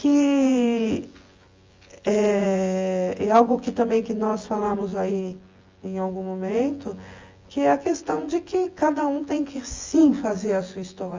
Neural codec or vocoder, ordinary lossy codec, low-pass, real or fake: vocoder, 24 kHz, 100 mel bands, Vocos; Opus, 32 kbps; 7.2 kHz; fake